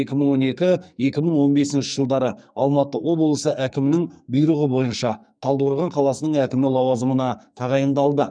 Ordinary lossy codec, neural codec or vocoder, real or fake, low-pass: none; codec, 44.1 kHz, 2.6 kbps, SNAC; fake; 9.9 kHz